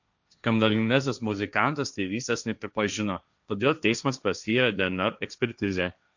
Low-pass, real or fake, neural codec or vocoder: 7.2 kHz; fake; codec, 16 kHz, 1.1 kbps, Voila-Tokenizer